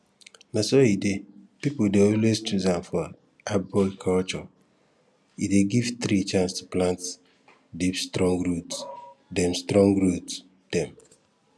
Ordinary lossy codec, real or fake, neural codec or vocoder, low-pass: none; real; none; none